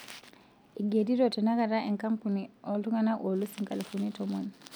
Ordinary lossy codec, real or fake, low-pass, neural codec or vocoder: none; real; none; none